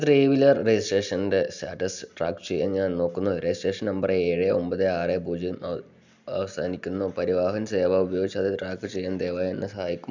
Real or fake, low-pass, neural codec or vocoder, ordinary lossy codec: real; 7.2 kHz; none; none